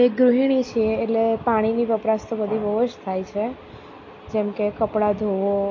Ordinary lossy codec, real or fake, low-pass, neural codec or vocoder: MP3, 32 kbps; real; 7.2 kHz; none